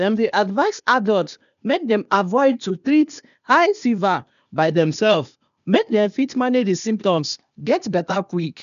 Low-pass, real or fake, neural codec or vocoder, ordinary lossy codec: 7.2 kHz; fake; codec, 16 kHz, 0.8 kbps, ZipCodec; none